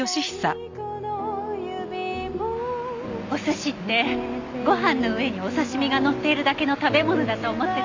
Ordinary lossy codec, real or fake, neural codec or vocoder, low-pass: AAC, 48 kbps; real; none; 7.2 kHz